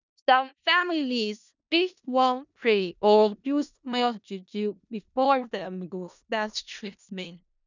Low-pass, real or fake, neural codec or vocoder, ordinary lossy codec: 7.2 kHz; fake; codec, 16 kHz in and 24 kHz out, 0.4 kbps, LongCat-Audio-Codec, four codebook decoder; none